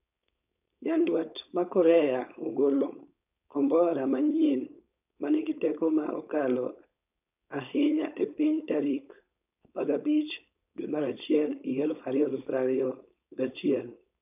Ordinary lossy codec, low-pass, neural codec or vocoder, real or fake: none; 3.6 kHz; codec, 16 kHz, 4.8 kbps, FACodec; fake